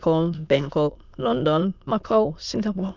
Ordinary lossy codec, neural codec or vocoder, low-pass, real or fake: none; autoencoder, 22.05 kHz, a latent of 192 numbers a frame, VITS, trained on many speakers; 7.2 kHz; fake